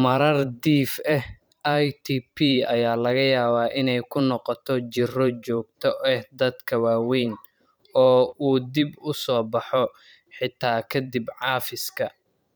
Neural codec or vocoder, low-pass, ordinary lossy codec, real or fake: vocoder, 44.1 kHz, 128 mel bands every 512 samples, BigVGAN v2; none; none; fake